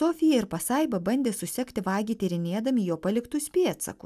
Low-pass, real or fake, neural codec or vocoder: 14.4 kHz; real; none